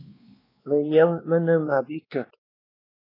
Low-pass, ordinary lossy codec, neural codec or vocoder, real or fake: 5.4 kHz; AAC, 24 kbps; codec, 16 kHz, 2 kbps, X-Codec, WavLM features, trained on Multilingual LibriSpeech; fake